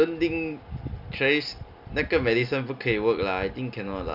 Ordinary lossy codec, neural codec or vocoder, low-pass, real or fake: none; none; 5.4 kHz; real